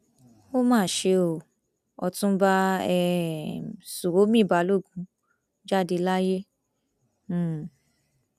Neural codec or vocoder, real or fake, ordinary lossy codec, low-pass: none; real; none; 14.4 kHz